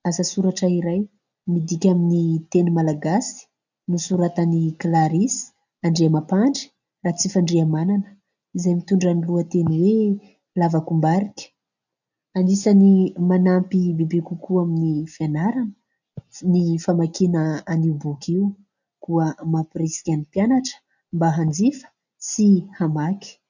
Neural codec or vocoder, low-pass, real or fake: none; 7.2 kHz; real